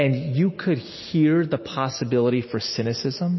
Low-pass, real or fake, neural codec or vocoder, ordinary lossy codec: 7.2 kHz; real; none; MP3, 24 kbps